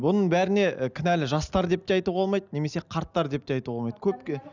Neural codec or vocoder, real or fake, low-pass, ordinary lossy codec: none; real; 7.2 kHz; none